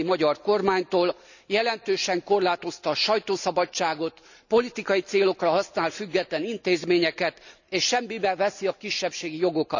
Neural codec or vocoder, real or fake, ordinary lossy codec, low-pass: none; real; none; 7.2 kHz